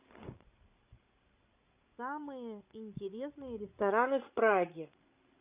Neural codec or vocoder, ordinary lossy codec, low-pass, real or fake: codec, 16 kHz, 16 kbps, FreqCodec, larger model; Opus, 64 kbps; 3.6 kHz; fake